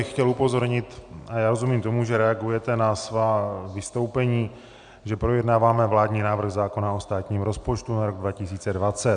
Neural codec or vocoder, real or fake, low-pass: none; real; 9.9 kHz